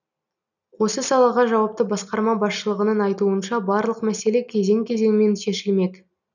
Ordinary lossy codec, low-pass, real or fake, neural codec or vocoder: none; 7.2 kHz; real; none